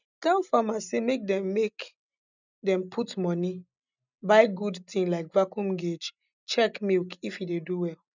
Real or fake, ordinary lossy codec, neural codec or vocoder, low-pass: real; none; none; 7.2 kHz